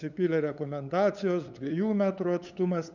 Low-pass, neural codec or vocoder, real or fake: 7.2 kHz; codec, 16 kHz, 8 kbps, FunCodec, trained on LibriTTS, 25 frames a second; fake